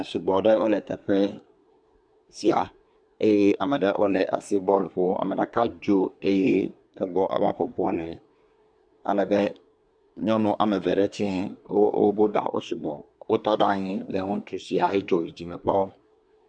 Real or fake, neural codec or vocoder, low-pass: fake; codec, 24 kHz, 1 kbps, SNAC; 9.9 kHz